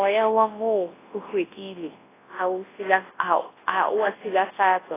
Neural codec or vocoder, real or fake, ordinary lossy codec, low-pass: codec, 24 kHz, 0.9 kbps, WavTokenizer, large speech release; fake; AAC, 16 kbps; 3.6 kHz